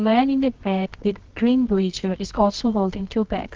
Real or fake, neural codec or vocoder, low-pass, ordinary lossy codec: fake; codec, 24 kHz, 0.9 kbps, WavTokenizer, medium music audio release; 7.2 kHz; Opus, 16 kbps